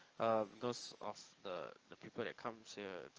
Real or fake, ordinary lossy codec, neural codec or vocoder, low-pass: fake; Opus, 24 kbps; codec, 44.1 kHz, 7.8 kbps, DAC; 7.2 kHz